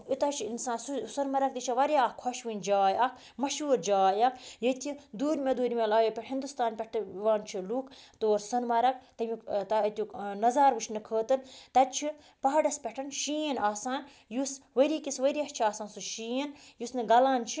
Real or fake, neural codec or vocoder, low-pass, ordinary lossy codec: real; none; none; none